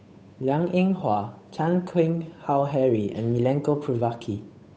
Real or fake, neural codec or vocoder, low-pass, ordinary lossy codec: fake; codec, 16 kHz, 8 kbps, FunCodec, trained on Chinese and English, 25 frames a second; none; none